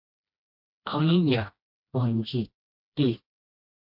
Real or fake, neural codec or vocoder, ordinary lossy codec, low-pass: fake; codec, 16 kHz, 1 kbps, FreqCodec, smaller model; MP3, 48 kbps; 5.4 kHz